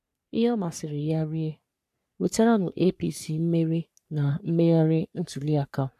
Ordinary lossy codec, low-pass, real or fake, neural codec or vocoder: none; 14.4 kHz; fake; codec, 44.1 kHz, 3.4 kbps, Pupu-Codec